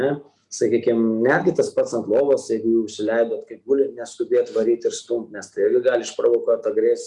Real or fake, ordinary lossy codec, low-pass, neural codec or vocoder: real; Opus, 64 kbps; 10.8 kHz; none